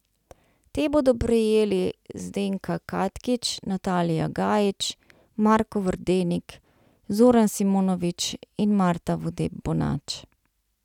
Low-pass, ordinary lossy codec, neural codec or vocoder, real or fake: 19.8 kHz; none; none; real